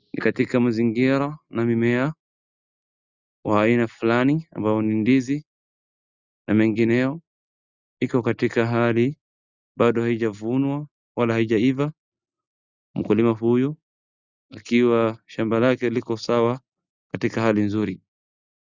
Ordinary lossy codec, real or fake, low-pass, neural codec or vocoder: Opus, 64 kbps; real; 7.2 kHz; none